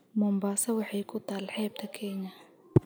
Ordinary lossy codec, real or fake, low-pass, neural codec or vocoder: none; real; none; none